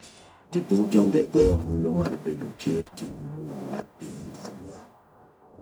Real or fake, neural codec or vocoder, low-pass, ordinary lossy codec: fake; codec, 44.1 kHz, 0.9 kbps, DAC; none; none